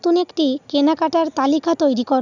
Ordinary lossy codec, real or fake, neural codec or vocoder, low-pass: none; real; none; 7.2 kHz